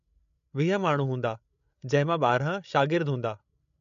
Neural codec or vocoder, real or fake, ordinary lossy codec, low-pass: codec, 16 kHz, 16 kbps, FreqCodec, larger model; fake; MP3, 48 kbps; 7.2 kHz